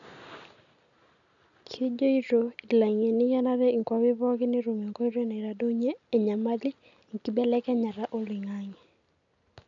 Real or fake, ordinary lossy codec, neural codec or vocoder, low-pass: real; none; none; 7.2 kHz